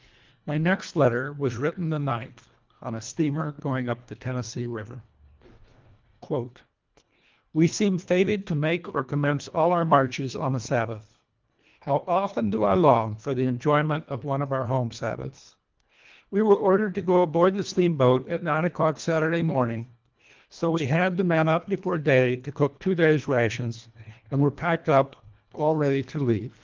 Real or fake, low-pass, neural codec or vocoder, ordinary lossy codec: fake; 7.2 kHz; codec, 24 kHz, 1.5 kbps, HILCodec; Opus, 32 kbps